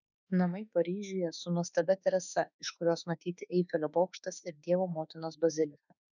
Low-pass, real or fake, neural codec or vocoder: 7.2 kHz; fake; autoencoder, 48 kHz, 32 numbers a frame, DAC-VAE, trained on Japanese speech